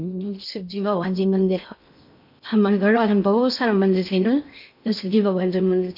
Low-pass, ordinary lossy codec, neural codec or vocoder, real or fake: 5.4 kHz; none; codec, 16 kHz in and 24 kHz out, 0.6 kbps, FocalCodec, streaming, 2048 codes; fake